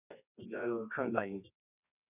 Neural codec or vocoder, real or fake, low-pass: codec, 24 kHz, 0.9 kbps, WavTokenizer, medium music audio release; fake; 3.6 kHz